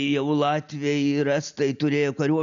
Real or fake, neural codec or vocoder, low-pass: real; none; 7.2 kHz